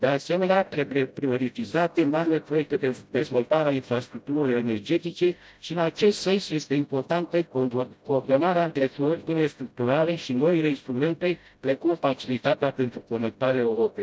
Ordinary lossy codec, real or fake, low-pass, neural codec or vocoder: none; fake; none; codec, 16 kHz, 0.5 kbps, FreqCodec, smaller model